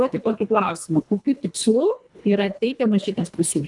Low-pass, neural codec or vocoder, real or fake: 10.8 kHz; codec, 24 kHz, 1.5 kbps, HILCodec; fake